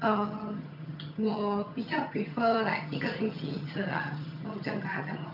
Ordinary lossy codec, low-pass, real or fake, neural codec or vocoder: none; 5.4 kHz; fake; vocoder, 22.05 kHz, 80 mel bands, HiFi-GAN